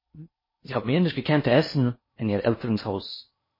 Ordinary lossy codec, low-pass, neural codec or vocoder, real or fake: MP3, 24 kbps; 5.4 kHz; codec, 16 kHz in and 24 kHz out, 0.6 kbps, FocalCodec, streaming, 4096 codes; fake